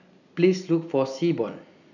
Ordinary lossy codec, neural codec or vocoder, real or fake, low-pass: none; none; real; 7.2 kHz